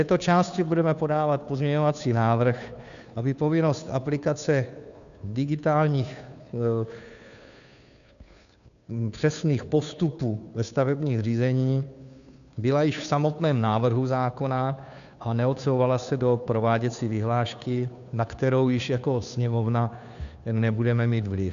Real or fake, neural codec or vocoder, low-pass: fake; codec, 16 kHz, 2 kbps, FunCodec, trained on Chinese and English, 25 frames a second; 7.2 kHz